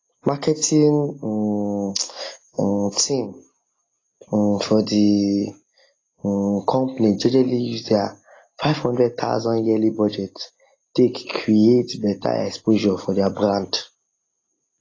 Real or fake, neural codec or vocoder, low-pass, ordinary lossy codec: real; none; 7.2 kHz; AAC, 32 kbps